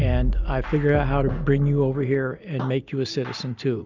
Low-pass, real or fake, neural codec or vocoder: 7.2 kHz; real; none